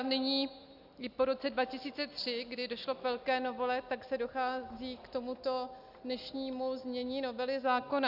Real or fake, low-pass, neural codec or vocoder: real; 5.4 kHz; none